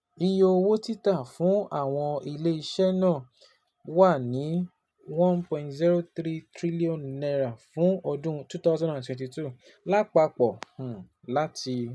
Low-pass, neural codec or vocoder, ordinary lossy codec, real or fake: none; none; none; real